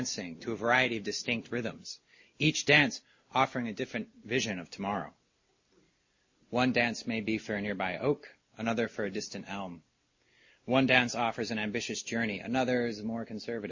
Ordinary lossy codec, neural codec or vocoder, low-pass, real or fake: MP3, 32 kbps; none; 7.2 kHz; real